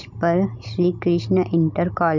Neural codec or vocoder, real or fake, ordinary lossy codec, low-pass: none; real; none; 7.2 kHz